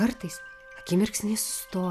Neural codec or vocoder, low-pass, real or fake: none; 14.4 kHz; real